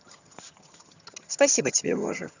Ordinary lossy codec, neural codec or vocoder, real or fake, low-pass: none; vocoder, 22.05 kHz, 80 mel bands, HiFi-GAN; fake; 7.2 kHz